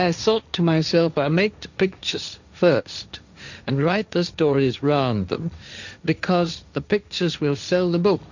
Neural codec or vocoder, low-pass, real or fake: codec, 16 kHz, 1.1 kbps, Voila-Tokenizer; 7.2 kHz; fake